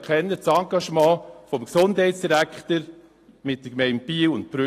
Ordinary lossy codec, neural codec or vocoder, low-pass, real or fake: AAC, 48 kbps; none; 14.4 kHz; real